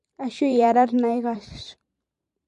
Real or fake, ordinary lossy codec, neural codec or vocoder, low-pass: fake; MP3, 48 kbps; vocoder, 44.1 kHz, 128 mel bands every 512 samples, BigVGAN v2; 14.4 kHz